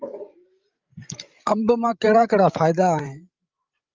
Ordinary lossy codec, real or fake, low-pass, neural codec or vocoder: Opus, 24 kbps; fake; 7.2 kHz; codec, 16 kHz, 16 kbps, FreqCodec, larger model